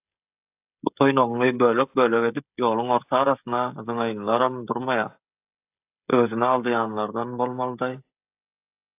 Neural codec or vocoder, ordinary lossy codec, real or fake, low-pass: codec, 16 kHz, 16 kbps, FreqCodec, smaller model; AAC, 32 kbps; fake; 3.6 kHz